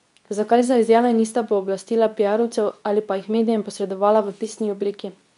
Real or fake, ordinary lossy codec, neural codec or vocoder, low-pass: fake; none; codec, 24 kHz, 0.9 kbps, WavTokenizer, medium speech release version 2; 10.8 kHz